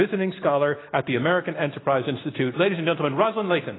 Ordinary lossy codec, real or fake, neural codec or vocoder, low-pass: AAC, 16 kbps; real; none; 7.2 kHz